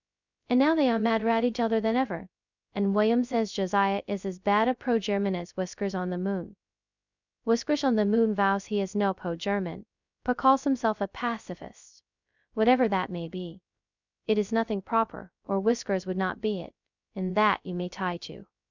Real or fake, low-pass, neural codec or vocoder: fake; 7.2 kHz; codec, 16 kHz, 0.2 kbps, FocalCodec